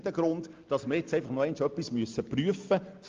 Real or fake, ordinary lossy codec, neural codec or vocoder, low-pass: real; Opus, 32 kbps; none; 7.2 kHz